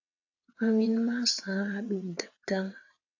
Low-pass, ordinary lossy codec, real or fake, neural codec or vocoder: 7.2 kHz; AAC, 48 kbps; fake; vocoder, 22.05 kHz, 80 mel bands, WaveNeXt